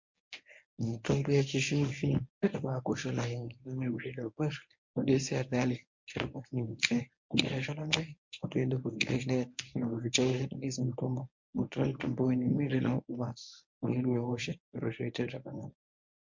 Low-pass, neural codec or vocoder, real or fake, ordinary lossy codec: 7.2 kHz; codec, 24 kHz, 0.9 kbps, WavTokenizer, medium speech release version 1; fake; MP3, 48 kbps